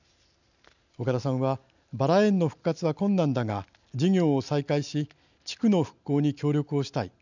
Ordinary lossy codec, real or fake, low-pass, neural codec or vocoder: none; real; 7.2 kHz; none